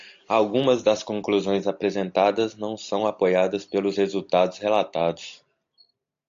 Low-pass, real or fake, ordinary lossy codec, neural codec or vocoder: 7.2 kHz; real; AAC, 64 kbps; none